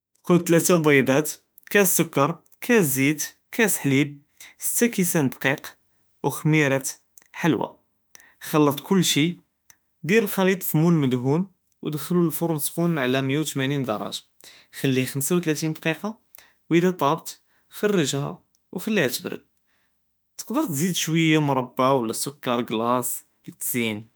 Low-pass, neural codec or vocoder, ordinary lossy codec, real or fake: none; autoencoder, 48 kHz, 32 numbers a frame, DAC-VAE, trained on Japanese speech; none; fake